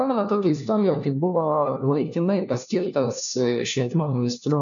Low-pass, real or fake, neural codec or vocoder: 7.2 kHz; fake; codec, 16 kHz, 1 kbps, FunCodec, trained on LibriTTS, 50 frames a second